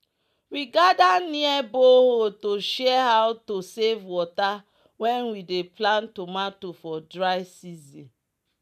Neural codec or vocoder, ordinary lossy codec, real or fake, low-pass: none; none; real; 14.4 kHz